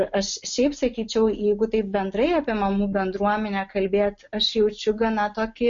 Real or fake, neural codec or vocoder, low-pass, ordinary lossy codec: real; none; 7.2 kHz; MP3, 48 kbps